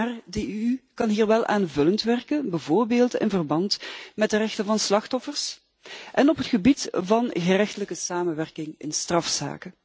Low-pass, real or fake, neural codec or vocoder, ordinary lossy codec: none; real; none; none